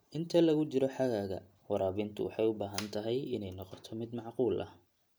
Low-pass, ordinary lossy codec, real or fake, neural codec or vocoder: none; none; real; none